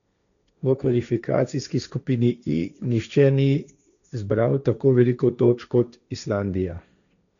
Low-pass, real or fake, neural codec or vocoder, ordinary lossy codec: 7.2 kHz; fake; codec, 16 kHz, 1.1 kbps, Voila-Tokenizer; none